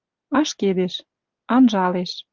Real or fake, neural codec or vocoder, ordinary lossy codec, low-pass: real; none; Opus, 24 kbps; 7.2 kHz